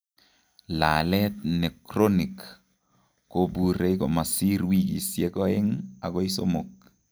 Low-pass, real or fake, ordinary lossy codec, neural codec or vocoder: none; real; none; none